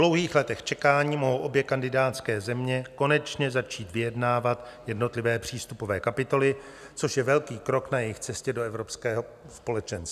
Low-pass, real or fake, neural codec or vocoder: 14.4 kHz; real; none